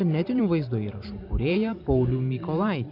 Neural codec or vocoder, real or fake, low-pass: vocoder, 44.1 kHz, 128 mel bands every 512 samples, BigVGAN v2; fake; 5.4 kHz